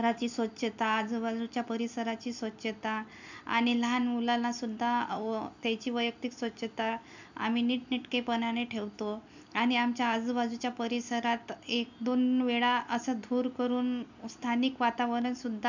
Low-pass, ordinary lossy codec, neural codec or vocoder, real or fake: 7.2 kHz; none; none; real